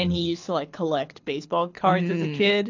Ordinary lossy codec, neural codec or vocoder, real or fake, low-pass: AAC, 48 kbps; codec, 24 kHz, 6 kbps, HILCodec; fake; 7.2 kHz